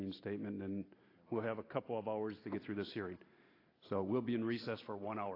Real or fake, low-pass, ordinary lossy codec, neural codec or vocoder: real; 5.4 kHz; AAC, 24 kbps; none